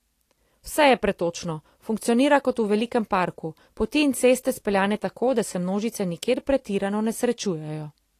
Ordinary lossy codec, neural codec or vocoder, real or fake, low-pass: AAC, 48 kbps; none; real; 14.4 kHz